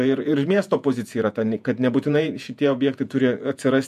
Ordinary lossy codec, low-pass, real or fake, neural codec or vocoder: MP3, 96 kbps; 14.4 kHz; fake; vocoder, 48 kHz, 128 mel bands, Vocos